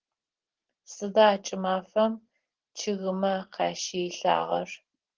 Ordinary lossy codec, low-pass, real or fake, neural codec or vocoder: Opus, 16 kbps; 7.2 kHz; fake; vocoder, 44.1 kHz, 128 mel bands every 512 samples, BigVGAN v2